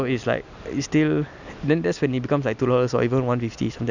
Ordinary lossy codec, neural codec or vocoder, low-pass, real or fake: none; none; 7.2 kHz; real